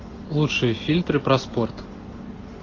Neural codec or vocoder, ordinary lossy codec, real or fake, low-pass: none; AAC, 32 kbps; real; 7.2 kHz